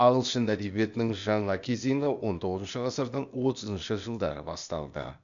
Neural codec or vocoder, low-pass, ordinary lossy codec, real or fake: codec, 16 kHz, 0.8 kbps, ZipCodec; 7.2 kHz; none; fake